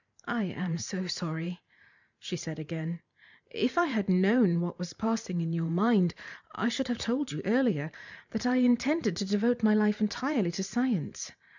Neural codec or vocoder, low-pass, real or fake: vocoder, 44.1 kHz, 128 mel bands every 512 samples, BigVGAN v2; 7.2 kHz; fake